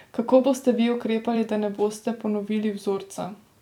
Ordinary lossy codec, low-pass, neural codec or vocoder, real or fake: none; 19.8 kHz; vocoder, 44.1 kHz, 128 mel bands every 256 samples, BigVGAN v2; fake